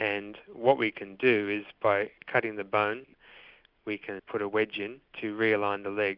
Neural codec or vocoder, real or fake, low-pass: none; real; 5.4 kHz